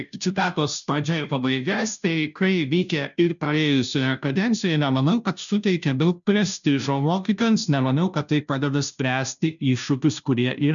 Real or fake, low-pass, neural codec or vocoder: fake; 7.2 kHz; codec, 16 kHz, 0.5 kbps, FunCodec, trained on Chinese and English, 25 frames a second